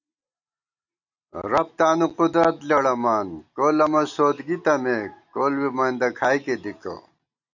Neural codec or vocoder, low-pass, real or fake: none; 7.2 kHz; real